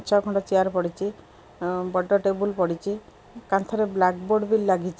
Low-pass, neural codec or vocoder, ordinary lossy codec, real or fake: none; none; none; real